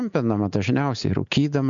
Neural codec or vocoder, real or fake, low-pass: none; real; 7.2 kHz